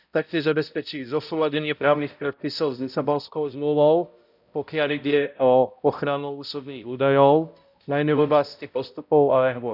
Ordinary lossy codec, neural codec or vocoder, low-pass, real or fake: none; codec, 16 kHz, 0.5 kbps, X-Codec, HuBERT features, trained on balanced general audio; 5.4 kHz; fake